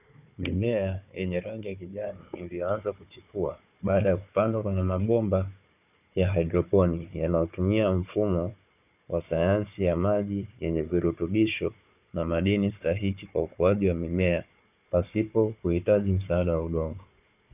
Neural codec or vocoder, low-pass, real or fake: codec, 16 kHz, 4 kbps, FunCodec, trained on Chinese and English, 50 frames a second; 3.6 kHz; fake